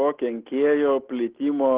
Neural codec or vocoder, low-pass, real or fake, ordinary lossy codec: none; 3.6 kHz; real; Opus, 16 kbps